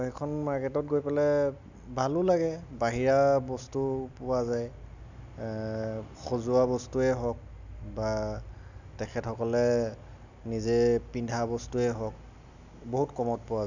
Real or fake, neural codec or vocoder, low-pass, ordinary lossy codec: real; none; 7.2 kHz; none